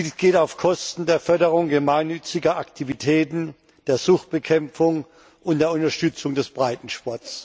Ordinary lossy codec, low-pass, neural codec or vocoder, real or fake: none; none; none; real